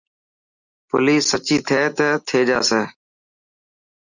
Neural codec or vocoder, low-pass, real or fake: none; 7.2 kHz; real